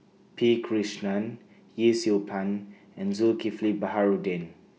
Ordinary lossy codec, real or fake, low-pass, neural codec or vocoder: none; real; none; none